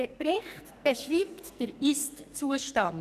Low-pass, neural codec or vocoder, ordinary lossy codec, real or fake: 14.4 kHz; codec, 44.1 kHz, 2.6 kbps, SNAC; none; fake